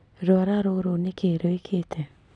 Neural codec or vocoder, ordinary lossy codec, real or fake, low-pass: vocoder, 44.1 kHz, 128 mel bands every 256 samples, BigVGAN v2; none; fake; 10.8 kHz